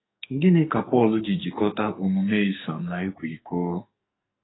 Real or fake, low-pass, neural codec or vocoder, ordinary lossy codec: fake; 7.2 kHz; codec, 44.1 kHz, 2.6 kbps, SNAC; AAC, 16 kbps